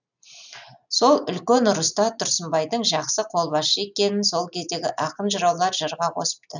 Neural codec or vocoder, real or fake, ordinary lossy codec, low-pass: none; real; none; 7.2 kHz